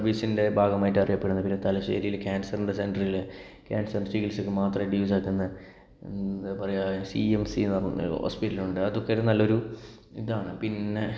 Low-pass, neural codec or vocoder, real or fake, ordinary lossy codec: none; none; real; none